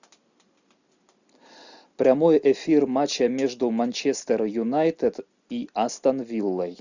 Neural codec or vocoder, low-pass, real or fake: none; 7.2 kHz; real